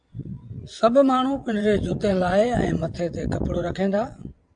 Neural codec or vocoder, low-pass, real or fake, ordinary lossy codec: vocoder, 22.05 kHz, 80 mel bands, WaveNeXt; 9.9 kHz; fake; AAC, 64 kbps